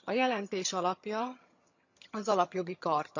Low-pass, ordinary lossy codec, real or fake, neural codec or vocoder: 7.2 kHz; none; fake; vocoder, 22.05 kHz, 80 mel bands, HiFi-GAN